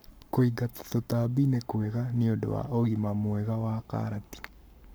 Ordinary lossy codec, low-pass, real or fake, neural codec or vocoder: none; none; fake; codec, 44.1 kHz, 7.8 kbps, Pupu-Codec